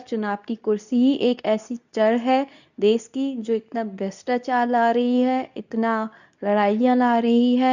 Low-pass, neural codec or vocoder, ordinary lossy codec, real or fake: 7.2 kHz; codec, 24 kHz, 0.9 kbps, WavTokenizer, medium speech release version 2; none; fake